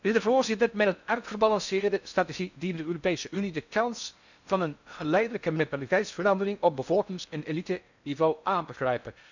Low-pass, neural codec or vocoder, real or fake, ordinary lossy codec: 7.2 kHz; codec, 16 kHz in and 24 kHz out, 0.6 kbps, FocalCodec, streaming, 4096 codes; fake; none